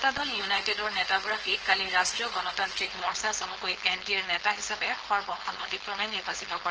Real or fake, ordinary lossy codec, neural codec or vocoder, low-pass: fake; Opus, 16 kbps; codec, 16 kHz, 4.8 kbps, FACodec; 7.2 kHz